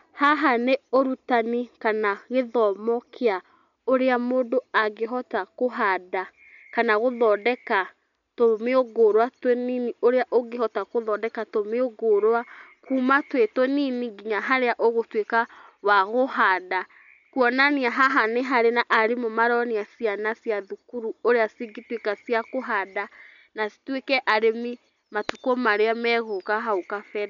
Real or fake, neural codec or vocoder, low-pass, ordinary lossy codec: real; none; 7.2 kHz; none